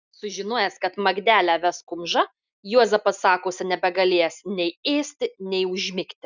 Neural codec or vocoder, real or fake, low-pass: none; real; 7.2 kHz